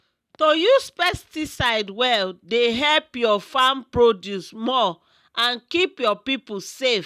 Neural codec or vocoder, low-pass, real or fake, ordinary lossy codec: none; 14.4 kHz; real; none